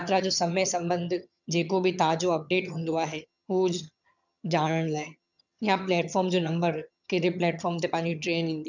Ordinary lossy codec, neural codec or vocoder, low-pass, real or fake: none; vocoder, 22.05 kHz, 80 mel bands, HiFi-GAN; 7.2 kHz; fake